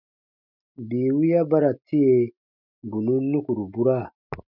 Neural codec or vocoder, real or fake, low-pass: none; real; 5.4 kHz